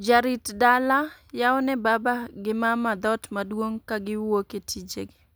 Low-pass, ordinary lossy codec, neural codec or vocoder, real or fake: none; none; none; real